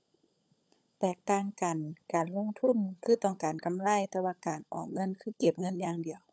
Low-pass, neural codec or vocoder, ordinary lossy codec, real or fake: none; codec, 16 kHz, 16 kbps, FunCodec, trained on LibriTTS, 50 frames a second; none; fake